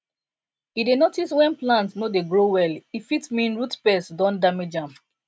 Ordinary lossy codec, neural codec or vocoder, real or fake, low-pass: none; none; real; none